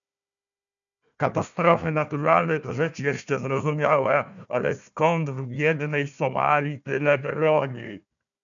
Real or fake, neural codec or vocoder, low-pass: fake; codec, 16 kHz, 1 kbps, FunCodec, trained on Chinese and English, 50 frames a second; 7.2 kHz